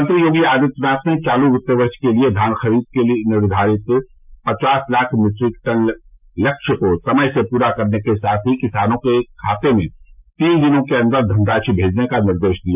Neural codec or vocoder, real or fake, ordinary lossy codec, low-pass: none; real; none; 3.6 kHz